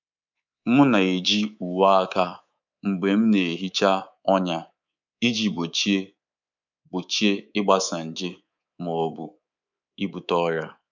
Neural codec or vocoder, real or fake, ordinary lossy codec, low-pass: codec, 24 kHz, 3.1 kbps, DualCodec; fake; none; 7.2 kHz